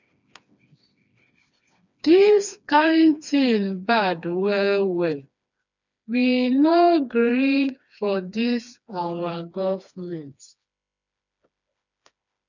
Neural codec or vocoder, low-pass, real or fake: codec, 16 kHz, 2 kbps, FreqCodec, smaller model; 7.2 kHz; fake